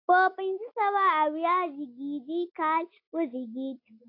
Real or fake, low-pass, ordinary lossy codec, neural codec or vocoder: real; 5.4 kHz; AAC, 32 kbps; none